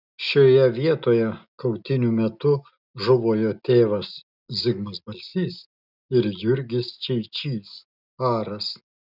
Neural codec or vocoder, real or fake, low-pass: none; real; 5.4 kHz